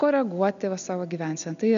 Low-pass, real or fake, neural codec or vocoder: 7.2 kHz; real; none